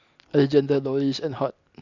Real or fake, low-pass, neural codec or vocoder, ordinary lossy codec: real; 7.2 kHz; none; none